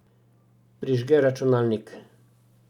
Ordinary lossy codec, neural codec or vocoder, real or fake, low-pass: none; none; real; 19.8 kHz